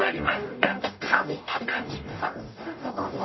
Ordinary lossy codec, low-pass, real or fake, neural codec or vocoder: MP3, 24 kbps; 7.2 kHz; fake; codec, 44.1 kHz, 0.9 kbps, DAC